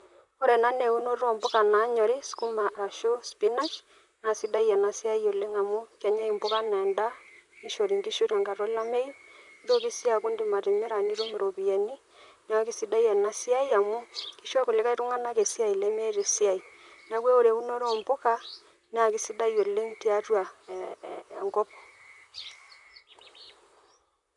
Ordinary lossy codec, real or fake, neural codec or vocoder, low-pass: none; fake; vocoder, 44.1 kHz, 128 mel bands, Pupu-Vocoder; 10.8 kHz